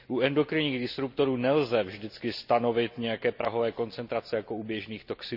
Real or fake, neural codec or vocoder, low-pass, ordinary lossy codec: real; none; 5.4 kHz; none